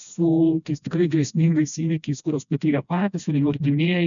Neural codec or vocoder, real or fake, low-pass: codec, 16 kHz, 1 kbps, FreqCodec, smaller model; fake; 7.2 kHz